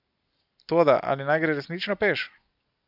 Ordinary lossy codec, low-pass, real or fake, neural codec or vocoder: none; 5.4 kHz; real; none